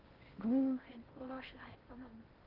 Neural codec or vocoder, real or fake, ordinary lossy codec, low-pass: codec, 16 kHz in and 24 kHz out, 0.6 kbps, FocalCodec, streaming, 4096 codes; fake; Opus, 16 kbps; 5.4 kHz